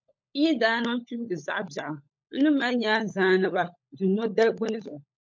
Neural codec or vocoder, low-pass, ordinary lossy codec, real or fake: codec, 16 kHz, 16 kbps, FunCodec, trained on LibriTTS, 50 frames a second; 7.2 kHz; MP3, 48 kbps; fake